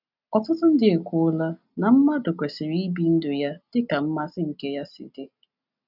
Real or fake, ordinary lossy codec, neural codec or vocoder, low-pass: real; none; none; 5.4 kHz